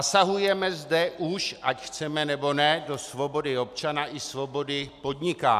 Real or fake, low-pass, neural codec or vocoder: real; 14.4 kHz; none